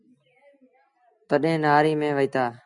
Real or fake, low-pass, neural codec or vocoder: real; 10.8 kHz; none